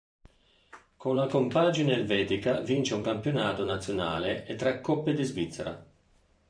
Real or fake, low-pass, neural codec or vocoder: fake; 9.9 kHz; vocoder, 44.1 kHz, 128 mel bands every 512 samples, BigVGAN v2